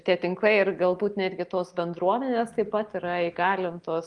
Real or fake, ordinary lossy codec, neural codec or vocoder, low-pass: real; Opus, 24 kbps; none; 10.8 kHz